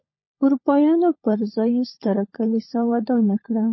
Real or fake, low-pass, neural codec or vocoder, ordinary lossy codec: fake; 7.2 kHz; codec, 16 kHz, 16 kbps, FunCodec, trained on LibriTTS, 50 frames a second; MP3, 24 kbps